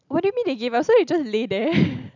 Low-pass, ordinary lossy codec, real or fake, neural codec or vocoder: 7.2 kHz; none; real; none